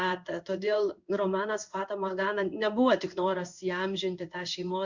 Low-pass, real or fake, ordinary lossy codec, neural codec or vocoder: 7.2 kHz; fake; Opus, 64 kbps; codec, 16 kHz in and 24 kHz out, 1 kbps, XY-Tokenizer